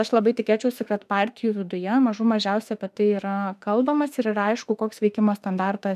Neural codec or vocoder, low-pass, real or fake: autoencoder, 48 kHz, 32 numbers a frame, DAC-VAE, trained on Japanese speech; 14.4 kHz; fake